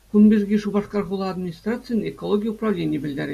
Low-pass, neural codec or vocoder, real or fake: 14.4 kHz; none; real